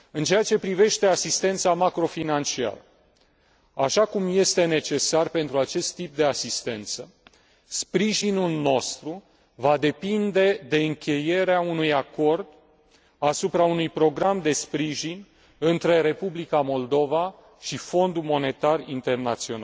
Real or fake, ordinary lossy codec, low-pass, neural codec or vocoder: real; none; none; none